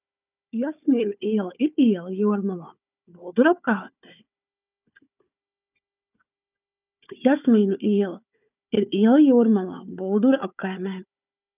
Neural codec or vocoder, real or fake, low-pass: codec, 16 kHz, 4 kbps, FunCodec, trained on Chinese and English, 50 frames a second; fake; 3.6 kHz